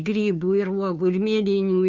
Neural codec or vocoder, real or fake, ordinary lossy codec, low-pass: codec, 16 kHz, 2 kbps, FunCodec, trained on Chinese and English, 25 frames a second; fake; MP3, 64 kbps; 7.2 kHz